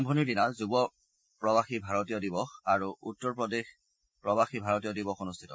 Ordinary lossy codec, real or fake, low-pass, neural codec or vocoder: none; real; none; none